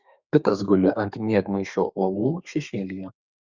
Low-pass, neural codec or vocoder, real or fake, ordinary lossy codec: 7.2 kHz; codec, 32 kHz, 1.9 kbps, SNAC; fake; Opus, 64 kbps